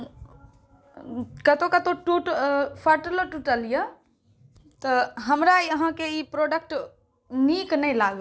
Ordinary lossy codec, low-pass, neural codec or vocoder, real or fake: none; none; none; real